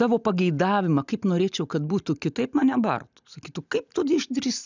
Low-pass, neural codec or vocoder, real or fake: 7.2 kHz; none; real